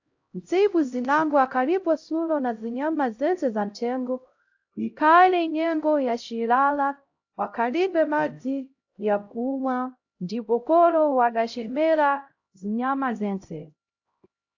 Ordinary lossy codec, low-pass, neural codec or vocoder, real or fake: AAC, 48 kbps; 7.2 kHz; codec, 16 kHz, 0.5 kbps, X-Codec, HuBERT features, trained on LibriSpeech; fake